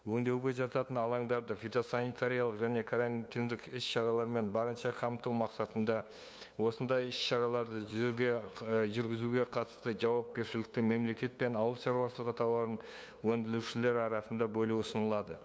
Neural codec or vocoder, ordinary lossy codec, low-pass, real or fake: codec, 16 kHz, 2 kbps, FunCodec, trained on LibriTTS, 25 frames a second; none; none; fake